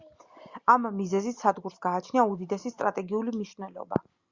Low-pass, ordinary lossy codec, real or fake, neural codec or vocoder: 7.2 kHz; Opus, 64 kbps; real; none